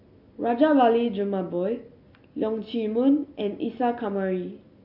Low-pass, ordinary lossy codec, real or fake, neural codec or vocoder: 5.4 kHz; AAC, 48 kbps; real; none